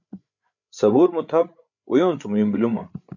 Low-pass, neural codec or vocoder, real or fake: 7.2 kHz; codec, 16 kHz, 8 kbps, FreqCodec, larger model; fake